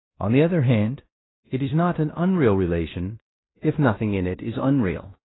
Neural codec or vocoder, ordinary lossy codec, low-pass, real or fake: codec, 16 kHz in and 24 kHz out, 0.9 kbps, LongCat-Audio-Codec, fine tuned four codebook decoder; AAC, 16 kbps; 7.2 kHz; fake